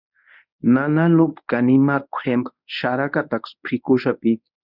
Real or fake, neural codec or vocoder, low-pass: fake; codec, 24 kHz, 0.9 kbps, WavTokenizer, medium speech release version 1; 5.4 kHz